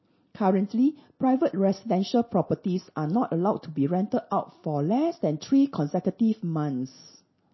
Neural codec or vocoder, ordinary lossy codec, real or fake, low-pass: none; MP3, 24 kbps; real; 7.2 kHz